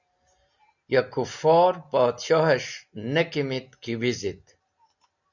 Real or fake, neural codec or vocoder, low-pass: real; none; 7.2 kHz